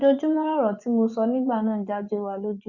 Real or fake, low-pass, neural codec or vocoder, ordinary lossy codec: fake; 7.2 kHz; vocoder, 24 kHz, 100 mel bands, Vocos; Opus, 64 kbps